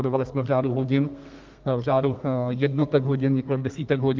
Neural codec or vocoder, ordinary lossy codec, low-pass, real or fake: codec, 32 kHz, 1.9 kbps, SNAC; Opus, 32 kbps; 7.2 kHz; fake